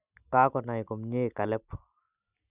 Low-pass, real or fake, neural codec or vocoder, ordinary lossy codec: 3.6 kHz; real; none; none